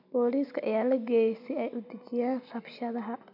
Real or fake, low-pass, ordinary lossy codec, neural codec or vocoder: real; 5.4 kHz; none; none